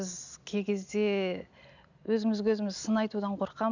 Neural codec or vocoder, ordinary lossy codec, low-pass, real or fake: none; none; 7.2 kHz; real